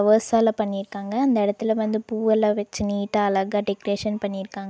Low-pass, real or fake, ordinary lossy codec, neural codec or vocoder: none; real; none; none